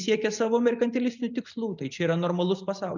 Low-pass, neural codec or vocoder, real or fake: 7.2 kHz; none; real